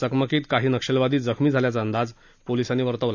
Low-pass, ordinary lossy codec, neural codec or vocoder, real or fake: 7.2 kHz; none; none; real